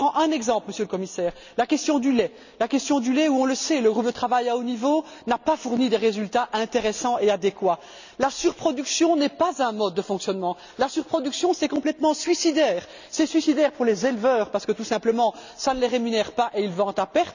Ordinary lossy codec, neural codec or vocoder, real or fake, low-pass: none; none; real; 7.2 kHz